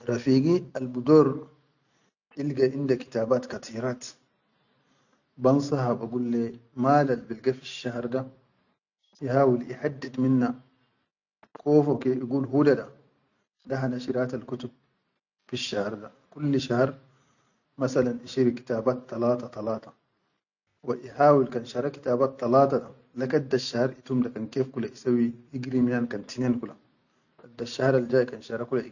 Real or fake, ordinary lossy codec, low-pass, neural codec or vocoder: real; none; 7.2 kHz; none